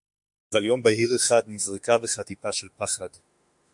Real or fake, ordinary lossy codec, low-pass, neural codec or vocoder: fake; MP3, 64 kbps; 10.8 kHz; autoencoder, 48 kHz, 32 numbers a frame, DAC-VAE, trained on Japanese speech